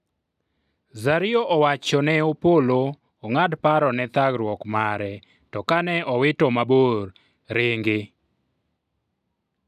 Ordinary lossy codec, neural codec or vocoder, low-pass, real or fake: none; none; 14.4 kHz; real